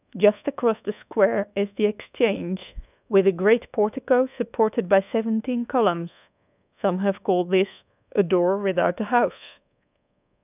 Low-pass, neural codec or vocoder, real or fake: 3.6 kHz; codec, 24 kHz, 1.2 kbps, DualCodec; fake